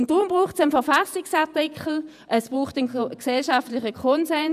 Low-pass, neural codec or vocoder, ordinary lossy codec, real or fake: 14.4 kHz; vocoder, 48 kHz, 128 mel bands, Vocos; none; fake